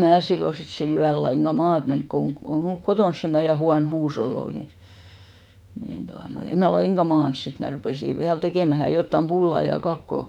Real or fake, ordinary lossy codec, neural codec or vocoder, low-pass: fake; none; autoencoder, 48 kHz, 32 numbers a frame, DAC-VAE, trained on Japanese speech; 19.8 kHz